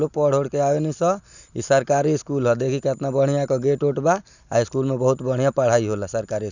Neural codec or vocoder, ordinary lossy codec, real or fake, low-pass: none; none; real; 7.2 kHz